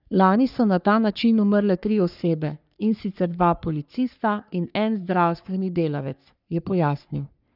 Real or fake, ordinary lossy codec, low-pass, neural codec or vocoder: fake; none; 5.4 kHz; codec, 44.1 kHz, 3.4 kbps, Pupu-Codec